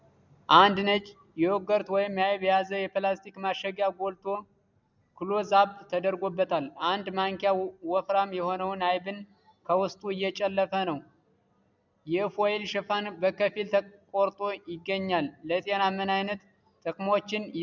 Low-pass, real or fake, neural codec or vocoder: 7.2 kHz; real; none